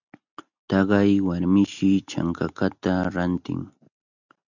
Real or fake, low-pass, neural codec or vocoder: real; 7.2 kHz; none